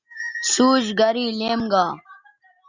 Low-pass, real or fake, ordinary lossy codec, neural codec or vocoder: 7.2 kHz; real; Opus, 64 kbps; none